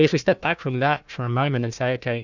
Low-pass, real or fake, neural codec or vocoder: 7.2 kHz; fake; codec, 16 kHz, 1 kbps, FunCodec, trained on Chinese and English, 50 frames a second